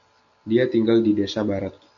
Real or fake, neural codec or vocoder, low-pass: real; none; 7.2 kHz